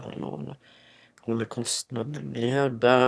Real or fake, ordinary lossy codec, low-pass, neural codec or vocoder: fake; none; none; autoencoder, 22.05 kHz, a latent of 192 numbers a frame, VITS, trained on one speaker